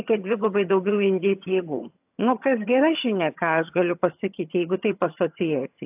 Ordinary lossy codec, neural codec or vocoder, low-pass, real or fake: AAC, 32 kbps; vocoder, 22.05 kHz, 80 mel bands, HiFi-GAN; 3.6 kHz; fake